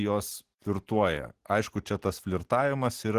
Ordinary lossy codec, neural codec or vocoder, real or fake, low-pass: Opus, 16 kbps; none; real; 14.4 kHz